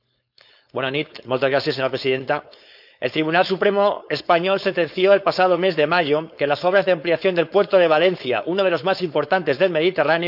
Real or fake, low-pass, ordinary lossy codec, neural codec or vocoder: fake; 5.4 kHz; none; codec, 16 kHz, 4.8 kbps, FACodec